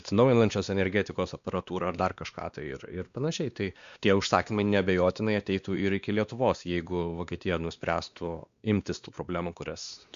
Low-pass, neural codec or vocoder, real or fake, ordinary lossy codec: 7.2 kHz; codec, 16 kHz, 2 kbps, X-Codec, WavLM features, trained on Multilingual LibriSpeech; fake; Opus, 64 kbps